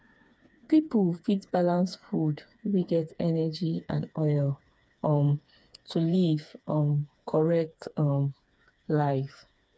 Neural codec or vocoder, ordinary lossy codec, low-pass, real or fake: codec, 16 kHz, 4 kbps, FreqCodec, smaller model; none; none; fake